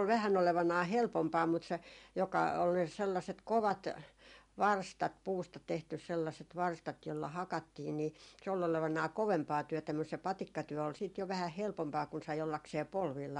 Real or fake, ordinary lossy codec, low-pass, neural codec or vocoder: real; MP3, 64 kbps; 10.8 kHz; none